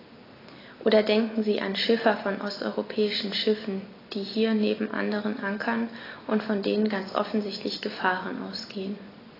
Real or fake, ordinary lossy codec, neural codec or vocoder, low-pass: real; AAC, 24 kbps; none; 5.4 kHz